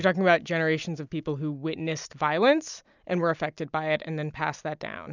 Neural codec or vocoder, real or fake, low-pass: none; real; 7.2 kHz